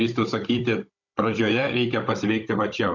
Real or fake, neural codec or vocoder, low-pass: fake; codec, 16 kHz, 16 kbps, FunCodec, trained on Chinese and English, 50 frames a second; 7.2 kHz